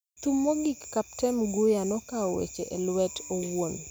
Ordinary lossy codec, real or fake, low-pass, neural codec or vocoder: none; real; none; none